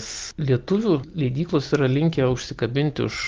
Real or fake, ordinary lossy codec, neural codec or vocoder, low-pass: real; Opus, 32 kbps; none; 7.2 kHz